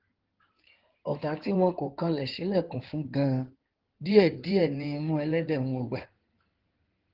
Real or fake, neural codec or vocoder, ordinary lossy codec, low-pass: fake; codec, 16 kHz in and 24 kHz out, 2.2 kbps, FireRedTTS-2 codec; Opus, 16 kbps; 5.4 kHz